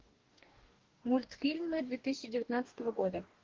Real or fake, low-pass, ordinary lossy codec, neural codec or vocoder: fake; 7.2 kHz; Opus, 16 kbps; codec, 44.1 kHz, 2.6 kbps, DAC